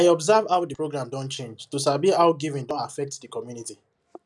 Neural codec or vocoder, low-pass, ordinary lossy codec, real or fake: none; none; none; real